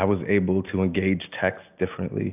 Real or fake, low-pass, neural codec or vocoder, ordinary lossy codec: real; 3.6 kHz; none; AAC, 32 kbps